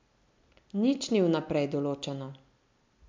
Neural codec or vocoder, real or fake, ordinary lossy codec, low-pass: none; real; MP3, 64 kbps; 7.2 kHz